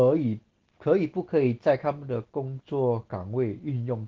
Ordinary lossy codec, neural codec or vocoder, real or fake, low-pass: Opus, 16 kbps; none; real; 7.2 kHz